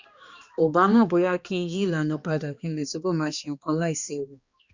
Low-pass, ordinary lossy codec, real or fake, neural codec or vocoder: 7.2 kHz; Opus, 64 kbps; fake; codec, 16 kHz, 2 kbps, X-Codec, HuBERT features, trained on balanced general audio